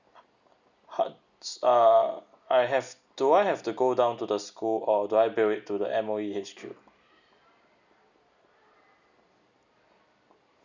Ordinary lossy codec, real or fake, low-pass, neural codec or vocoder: none; real; 7.2 kHz; none